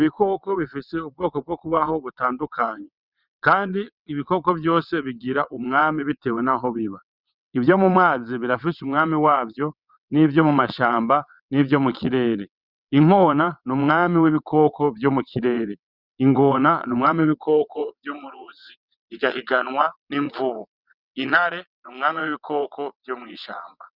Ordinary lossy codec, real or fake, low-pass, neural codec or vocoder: Opus, 64 kbps; fake; 5.4 kHz; vocoder, 22.05 kHz, 80 mel bands, WaveNeXt